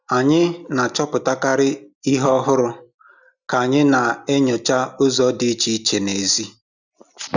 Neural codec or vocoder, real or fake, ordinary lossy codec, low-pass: none; real; none; 7.2 kHz